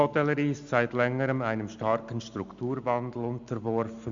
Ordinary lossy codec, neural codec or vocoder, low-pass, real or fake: Opus, 64 kbps; none; 7.2 kHz; real